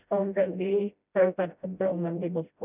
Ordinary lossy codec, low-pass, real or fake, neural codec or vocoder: none; 3.6 kHz; fake; codec, 16 kHz, 0.5 kbps, FreqCodec, smaller model